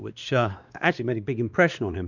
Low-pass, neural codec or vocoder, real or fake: 7.2 kHz; codec, 16 kHz, 2 kbps, X-Codec, WavLM features, trained on Multilingual LibriSpeech; fake